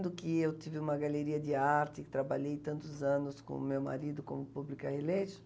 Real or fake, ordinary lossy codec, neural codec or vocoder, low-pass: real; none; none; none